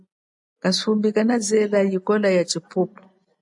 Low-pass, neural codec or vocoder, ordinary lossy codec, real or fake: 10.8 kHz; none; AAC, 64 kbps; real